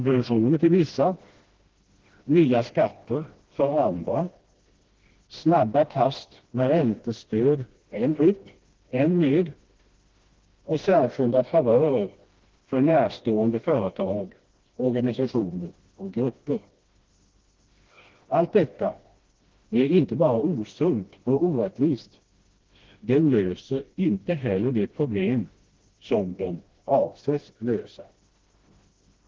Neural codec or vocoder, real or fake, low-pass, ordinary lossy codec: codec, 16 kHz, 1 kbps, FreqCodec, smaller model; fake; 7.2 kHz; Opus, 16 kbps